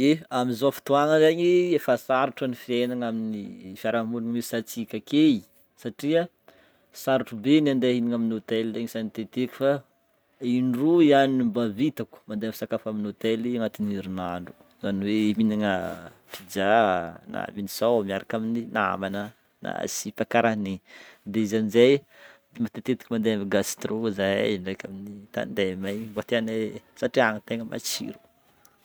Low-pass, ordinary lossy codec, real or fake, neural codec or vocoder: none; none; real; none